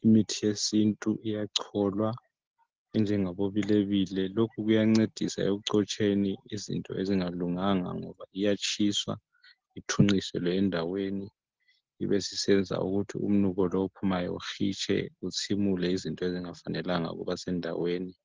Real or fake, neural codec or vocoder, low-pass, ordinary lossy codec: real; none; 7.2 kHz; Opus, 16 kbps